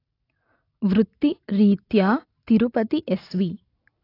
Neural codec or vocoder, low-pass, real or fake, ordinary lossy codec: none; 5.4 kHz; real; AAC, 32 kbps